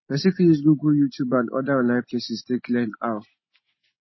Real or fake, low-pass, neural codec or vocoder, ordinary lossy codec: real; 7.2 kHz; none; MP3, 24 kbps